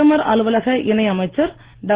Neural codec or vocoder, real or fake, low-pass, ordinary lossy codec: none; real; 3.6 kHz; Opus, 16 kbps